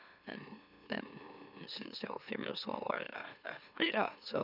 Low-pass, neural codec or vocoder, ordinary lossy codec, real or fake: 5.4 kHz; autoencoder, 44.1 kHz, a latent of 192 numbers a frame, MeloTTS; none; fake